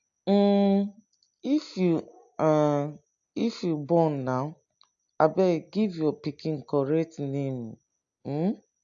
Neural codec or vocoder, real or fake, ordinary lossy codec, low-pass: none; real; AAC, 64 kbps; 7.2 kHz